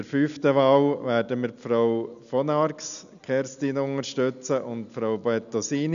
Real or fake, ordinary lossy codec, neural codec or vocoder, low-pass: real; none; none; 7.2 kHz